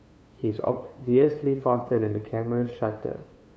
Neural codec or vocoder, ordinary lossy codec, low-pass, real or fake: codec, 16 kHz, 2 kbps, FunCodec, trained on LibriTTS, 25 frames a second; none; none; fake